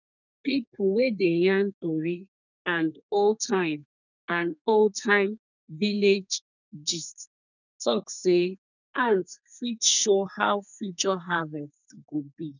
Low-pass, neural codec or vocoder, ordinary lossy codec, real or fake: 7.2 kHz; codec, 32 kHz, 1.9 kbps, SNAC; none; fake